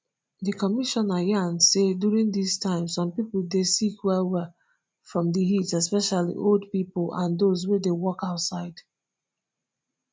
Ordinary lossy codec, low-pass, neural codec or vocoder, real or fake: none; none; none; real